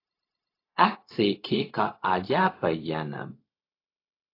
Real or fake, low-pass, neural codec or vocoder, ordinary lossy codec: fake; 5.4 kHz; codec, 16 kHz, 0.4 kbps, LongCat-Audio-Codec; AAC, 32 kbps